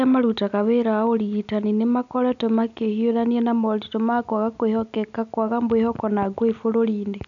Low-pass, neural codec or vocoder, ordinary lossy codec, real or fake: 7.2 kHz; none; none; real